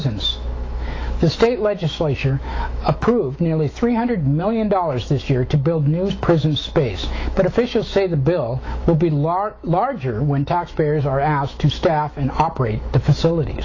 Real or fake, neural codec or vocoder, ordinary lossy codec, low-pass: fake; autoencoder, 48 kHz, 128 numbers a frame, DAC-VAE, trained on Japanese speech; AAC, 32 kbps; 7.2 kHz